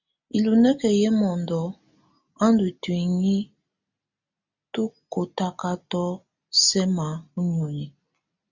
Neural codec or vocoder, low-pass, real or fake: none; 7.2 kHz; real